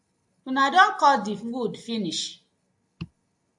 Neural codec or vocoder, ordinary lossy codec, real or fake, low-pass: none; AAC, 64 kbps; real; 10.8 kHz